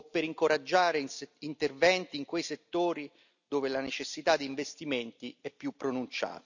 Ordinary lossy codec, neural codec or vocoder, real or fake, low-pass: none; none; real; 7.2 kHz